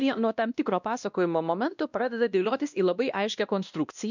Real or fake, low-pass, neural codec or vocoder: fake; 7.2 kHz; codec, 16 kHz, 1 kbps, X-Codec, WavLM features, trained on Multilingual LibriSpeech